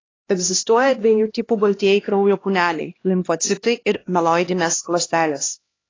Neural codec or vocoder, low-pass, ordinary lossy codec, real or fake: codec, 16 kHz, 1 kbps, X-Codec, HuBERT features, trained on LibriSpeech; 7.2 kHz; AAC, 32 kbps; fake